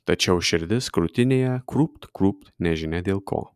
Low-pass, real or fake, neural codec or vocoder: 14.4 kHz; real; none